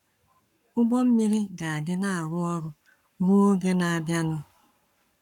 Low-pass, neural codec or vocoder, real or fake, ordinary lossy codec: 19.8 kHz; codec, 44.1 kHz, 7.8 kbps, Pupu-Codec; fake; none